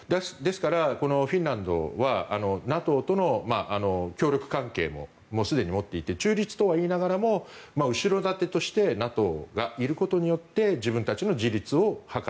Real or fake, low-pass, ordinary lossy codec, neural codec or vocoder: real; none; none; none